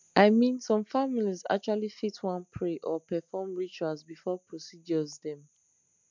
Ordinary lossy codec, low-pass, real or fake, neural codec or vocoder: MP3, 64 kbps; 7.2 kHz; real; none